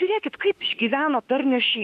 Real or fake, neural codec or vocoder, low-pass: fake; autoencoder, 48 kHz, 32 numbers a frame, DAC-VAE, trained on Japanese speech; 14.4 kHz